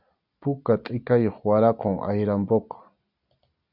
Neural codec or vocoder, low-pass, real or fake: none; 5.4 kHz; real